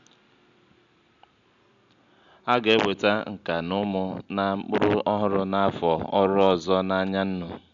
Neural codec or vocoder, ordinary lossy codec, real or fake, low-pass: none; none; real; 7.2 kHz